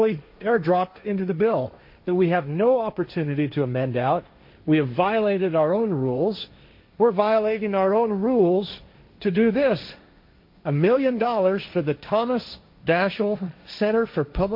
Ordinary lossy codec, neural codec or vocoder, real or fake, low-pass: MP3, 32 kbps; codec, 16 kHz, 1.1 kbps, Voila-Tokenizer; fake; 5.4 kHz